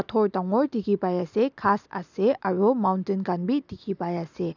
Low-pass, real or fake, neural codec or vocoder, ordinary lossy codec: 7.2 kHz; real; none; none